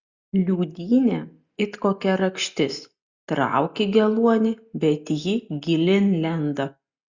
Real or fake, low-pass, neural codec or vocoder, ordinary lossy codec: real; 7.2 kHz; none; Opus, 64 kbps